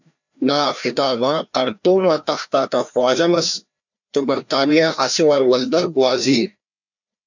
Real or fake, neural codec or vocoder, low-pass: fake; codec, 16 kHz, 1 kbps, FreqCodec, larger model; 7.2 kHz